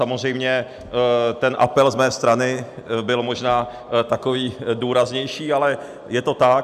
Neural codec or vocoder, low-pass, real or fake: none; 14.4 kHz; real